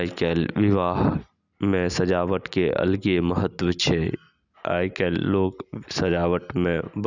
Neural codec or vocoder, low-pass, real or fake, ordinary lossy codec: none; 7.2 kHz; real; none